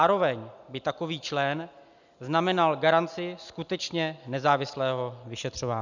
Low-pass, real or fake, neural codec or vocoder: 7.2 kHz; real; none